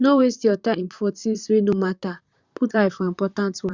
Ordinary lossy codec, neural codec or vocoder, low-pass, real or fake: Opus, 64 kbps; vocoder, 44.1 kHz, 128 mel bands, Pupu-Vocoder; 7.2 kHz; fake